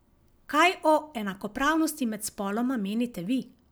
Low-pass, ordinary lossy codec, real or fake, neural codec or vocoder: none; none; real; none